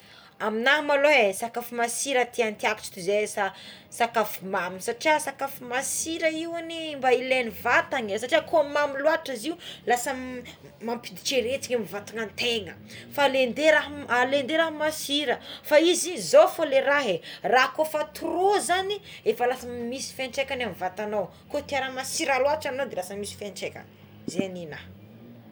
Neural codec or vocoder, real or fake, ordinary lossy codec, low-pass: none; real; none; none